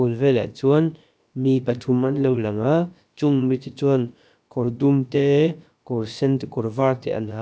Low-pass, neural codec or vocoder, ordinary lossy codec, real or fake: none; codec, 16 kHz, about 1 kbps, DyCAST, with the encoder's durations; none; fake